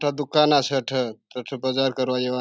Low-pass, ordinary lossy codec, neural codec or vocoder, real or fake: none; none; none; real